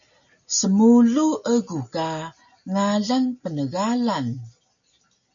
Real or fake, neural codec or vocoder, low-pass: real; none; 7.2 kHz